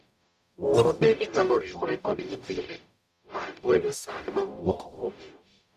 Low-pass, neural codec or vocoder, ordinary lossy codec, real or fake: 14.4 kHz; codec, 44.1 kHz, 0.9 kbps, DAC; none; fake